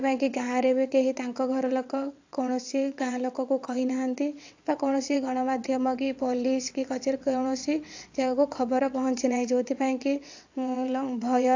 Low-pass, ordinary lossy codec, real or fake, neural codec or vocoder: 7.2 kHz; AAC, 48 kbps; fake; vocoder, 22.05 kHz, 80 mel bands, WaveNeXt